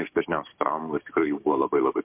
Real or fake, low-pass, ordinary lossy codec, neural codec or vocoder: fake; 3.6 kHz; MP3, 32 kbps; codec, 16 kHz, 6 kbps, DAC